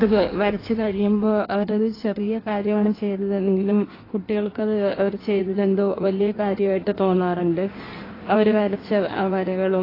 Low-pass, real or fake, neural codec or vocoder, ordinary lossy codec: 5.4 kHz; fake; codec, 16 kHz in and 24 kHz out, 1.1 kbps, FireRedTTS-2 codec; AAC, 24 kbps